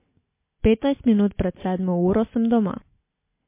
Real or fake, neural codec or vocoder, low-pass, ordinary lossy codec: real; none; 3.6 kHz; MP3, 24 kbps